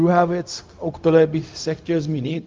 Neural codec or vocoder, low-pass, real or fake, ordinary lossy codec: codec, 16 kHz, 0.4 kbps, LongCat-Audio-Codec; 7.2 kHz; fake; Opus, 24 kbps